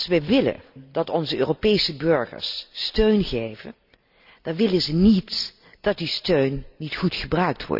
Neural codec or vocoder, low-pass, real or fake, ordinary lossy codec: none; 5.4 kHz; real; none